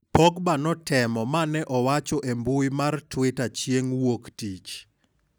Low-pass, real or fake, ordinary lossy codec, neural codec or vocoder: none; real; none; none